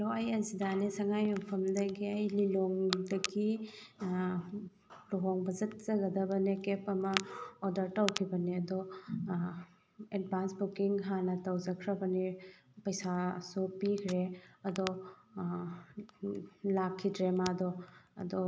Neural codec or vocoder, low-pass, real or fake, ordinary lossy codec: none; none; real; none